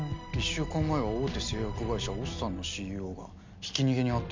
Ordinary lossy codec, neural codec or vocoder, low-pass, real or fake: MP3, 64 kbps; none; 7.2 kHz; real